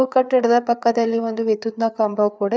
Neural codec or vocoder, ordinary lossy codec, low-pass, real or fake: codec, 16 kHz, 4 kbps, FreqCodec, larger model; none; none; fake